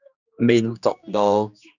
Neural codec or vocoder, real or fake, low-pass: codec, 16 kHz, 2 kbps, X-Codec, HuBERT features, trained on general audio; fake; 7.2 kHz